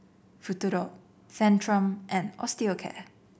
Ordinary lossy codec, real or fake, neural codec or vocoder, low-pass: none; real; none; none